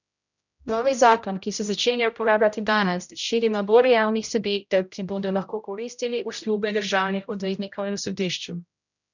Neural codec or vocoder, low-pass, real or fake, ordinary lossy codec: codec, 16 kHz, 0.5 kbps, X-Codec, HuBERT features, trained on general audio; 7.2 kHz; fake; none